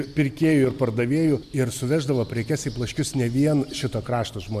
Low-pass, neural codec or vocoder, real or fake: 14.4 kHz; none; real